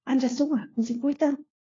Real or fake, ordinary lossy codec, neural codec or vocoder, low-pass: fake; AAC, 32 kbps; codec, 16 kHz, 1 kbps, FunCodec, trained on LibriTTS, 50 frames a second; 7.2 kHz